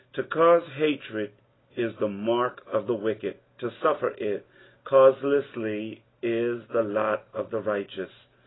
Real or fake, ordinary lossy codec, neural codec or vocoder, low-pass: real; AAC, 16 kbps; none; 7.2 kHz